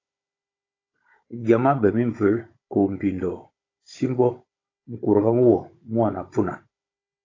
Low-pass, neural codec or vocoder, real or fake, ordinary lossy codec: 7.2 kHz; codec, 16 kHz, 16 kbps, FunCodec, trained on Chinese and English, 50 frames a second; fake; AAC, 32 kbps